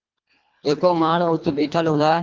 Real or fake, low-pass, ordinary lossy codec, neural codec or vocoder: fake; 7.2 kHz; Opus, 24 kbps; codec, 24 kHz, 1.5 kbps, HILCodec